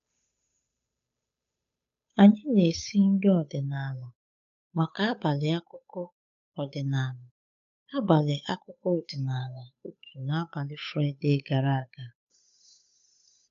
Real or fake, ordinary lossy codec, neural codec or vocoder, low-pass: fake; MP3, 64 kbps; codec, 16 kHz, 8 kbps, FunCodec, trained on Chinese and English, 25 frames a second; 7.2 kHz